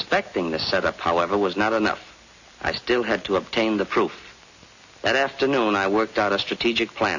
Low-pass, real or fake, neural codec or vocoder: 7.2 kHz; real; none